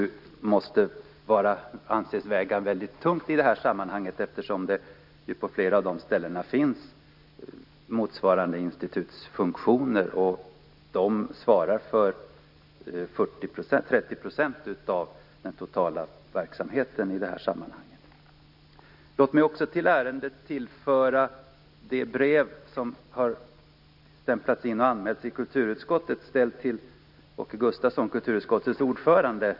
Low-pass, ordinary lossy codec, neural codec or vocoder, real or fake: 5.4 kHz; none; none; real